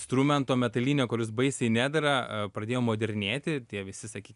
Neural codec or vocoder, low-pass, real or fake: none; 10.8 kHz; real